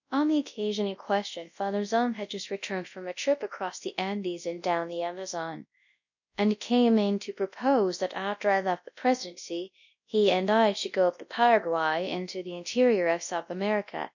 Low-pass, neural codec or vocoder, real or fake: 7.2 kHz; codec, 24 kHz, 0.9 kbps, WavTokenizer, large speech release; fake